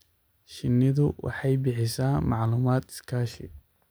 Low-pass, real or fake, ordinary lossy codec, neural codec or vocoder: none; real; none; none